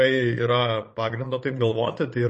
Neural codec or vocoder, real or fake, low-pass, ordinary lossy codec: vocoder, 22.05 kHz, 80 mel bands, Vocos; fake; 9.9 kHz; MP3, 32 kbps